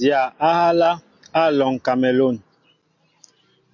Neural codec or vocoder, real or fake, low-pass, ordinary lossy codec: none; real; 7.2 kHz; MP3, 48 kbps